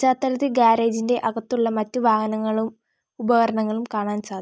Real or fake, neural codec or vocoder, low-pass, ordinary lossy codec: real; none; none; none